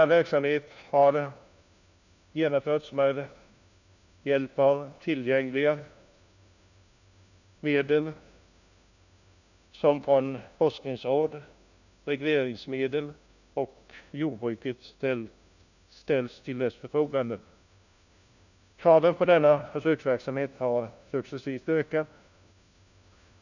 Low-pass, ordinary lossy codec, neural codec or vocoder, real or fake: 7.2 kHz; none; codec, 16 kHz, 1 kbps, FunCodec, trained on LibriTTS, 50 frames a second; fake